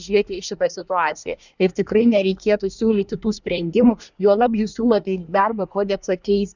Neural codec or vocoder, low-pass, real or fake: codec, 24 kHz, 1 kbps, SNAC; 7.2 kHz; fake